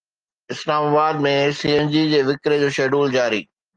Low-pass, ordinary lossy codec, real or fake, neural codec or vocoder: 9.9 kHz; Opus, 24 kbps; real; none